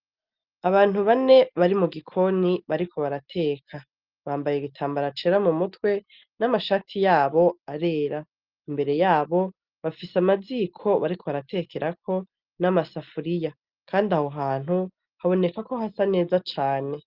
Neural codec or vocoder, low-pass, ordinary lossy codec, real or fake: none; 5.4 kHz; Opus, 24 kbps; real